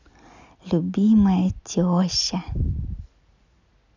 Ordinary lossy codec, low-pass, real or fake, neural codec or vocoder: none; 7.2 kHz; real; none